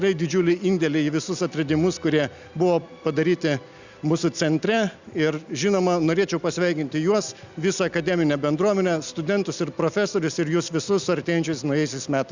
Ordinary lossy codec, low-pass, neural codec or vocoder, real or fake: Opus, 64 kbps; 7.2 kHz; none; real